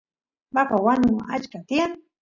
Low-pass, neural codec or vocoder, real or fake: 7.2 kHz; none; real